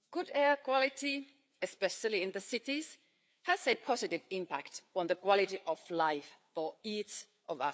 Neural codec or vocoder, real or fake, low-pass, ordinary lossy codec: codec, 16 kHz, 4 kbps, FreqCodec, larger model; fake; none; none